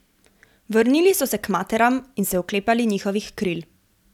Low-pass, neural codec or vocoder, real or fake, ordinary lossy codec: 19.8 kHz; vocoder, 44.1 kHz, 128 mel bands every 256 samples, BigVGAN v2; fake; none